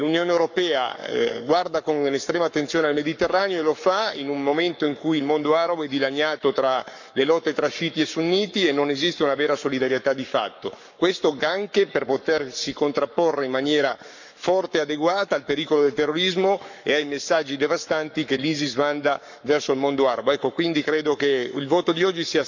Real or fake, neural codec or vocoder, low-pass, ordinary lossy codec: fake; codec, 44.1 kHz, 7.8 kbps, Pupu-Codec; 7.2 kHz; none